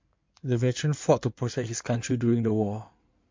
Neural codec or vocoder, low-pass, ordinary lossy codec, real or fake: codec, 16 kHz in and 24 kHz out, 2.2 kbps, FireRedTTS-2 codec; 7.2 kHz; MP3, 48 kbps; fake